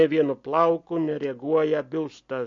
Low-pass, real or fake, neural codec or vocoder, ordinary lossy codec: 7.2 kHz; real; none; MP3, 48 kbps